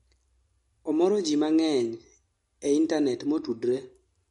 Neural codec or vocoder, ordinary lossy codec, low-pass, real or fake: none; MP3, 48 kbps; 19.8 kHz; real